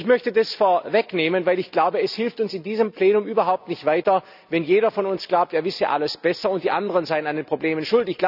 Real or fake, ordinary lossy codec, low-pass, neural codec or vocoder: real; none; 5.4 kHz; none